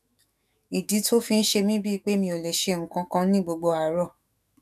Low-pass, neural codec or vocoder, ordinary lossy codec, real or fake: 14.4 kHz; autoencoder, 48 kHz, 128 numbers a frame, DAC-VAE, trained on Japanese speech; none; fake